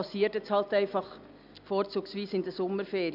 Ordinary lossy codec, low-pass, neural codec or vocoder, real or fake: none; 5.4 kHz; none; real